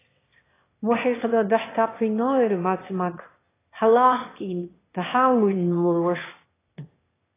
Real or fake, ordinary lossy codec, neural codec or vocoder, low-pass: fake; AAC, 16 kbps; autoencoder, 22.05 kHz, a latent of 192 numbers a frame, VITS, trained on one speaker; 3.6 kHz